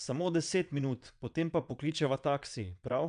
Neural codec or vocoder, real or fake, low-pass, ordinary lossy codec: vocoder, 22.05 kHz, 80 mel bands, WaveNeXt; fake; 9.9 kHz; none